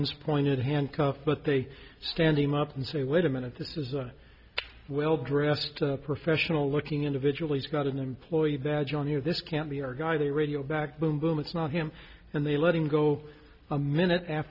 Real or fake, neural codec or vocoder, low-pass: real; none; 5.4 kHz